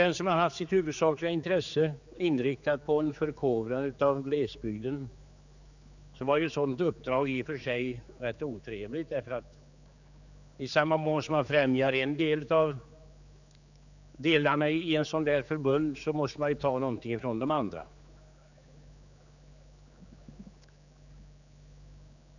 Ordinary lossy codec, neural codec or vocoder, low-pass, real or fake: none; codec, 16 kHz, 4 kbps, X-Codec, HuBERT features, trained on general audio; 7.2 kHz; fake